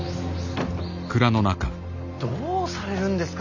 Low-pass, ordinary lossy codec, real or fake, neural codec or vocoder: 7.2 kHz; none; real; none